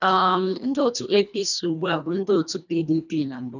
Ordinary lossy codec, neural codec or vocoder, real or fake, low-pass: none; codec, 24 kHz, 1.5 kbps, HILCodec; fake; 7.2 kHz